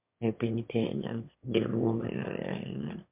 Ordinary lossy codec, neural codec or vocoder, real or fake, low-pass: MP3, 32 kbps; autoencoder, 22.05 kHz, a latent of 192 numbers a frame, VITS, trained on one speaker; fake; 3.6 kHz